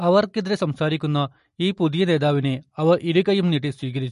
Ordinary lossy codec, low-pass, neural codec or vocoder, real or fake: MP3, 48 kbps; 14.4 kHz; none; real